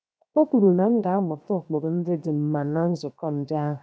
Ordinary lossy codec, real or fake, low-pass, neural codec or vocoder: none; fake; none; codec, 16 kHz, 0.7 kbps, FocalCodec